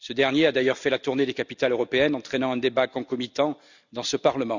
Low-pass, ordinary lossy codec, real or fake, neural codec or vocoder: 7.2 kHz; none; real; none